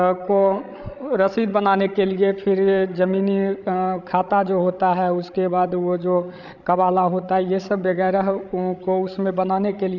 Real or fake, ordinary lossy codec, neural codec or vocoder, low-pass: fake; none; codec, 16 kHz, 16 kbps, FreqCodec, larger model; 7.2 kHz